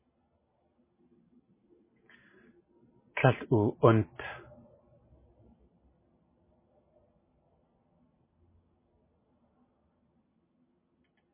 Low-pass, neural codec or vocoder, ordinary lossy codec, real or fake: 3.6 kHz; none; MP3, 16 kbps; real